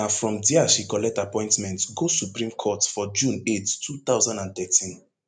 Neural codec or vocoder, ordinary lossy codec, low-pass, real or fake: none; none; 9.9 kHz; real